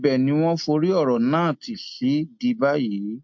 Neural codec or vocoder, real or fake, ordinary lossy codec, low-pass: none; real; MP3, 48 kbps; 7.2 kHz